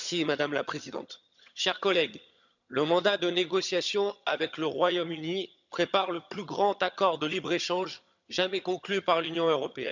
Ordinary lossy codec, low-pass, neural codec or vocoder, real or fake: none; 7.2 kHz; vocoder, 22.05 kHz, 80 mel bands, HiFi-GAN; fake